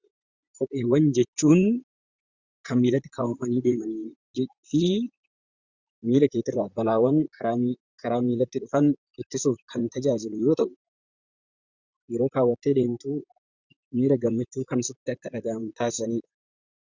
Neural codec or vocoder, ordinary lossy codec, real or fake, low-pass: vocoder, 44.1 kHz, 128 mel bands, Pupu-Vocoder; Opus, 64 kbps; fake; 7.2 kHz